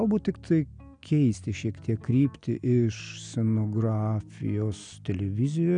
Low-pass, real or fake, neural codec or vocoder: 9.9 kHz; real; none